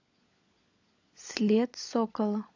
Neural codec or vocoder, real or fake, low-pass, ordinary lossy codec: codec, 16 kHz, 16 kbps, FunCodec, trained on LibriTTS, 50 frames a second; fake; 7.2 kHz; AAC, 48 kbps